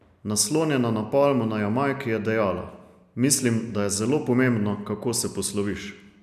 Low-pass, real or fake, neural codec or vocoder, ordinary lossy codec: 14.4 kHz; real; none; none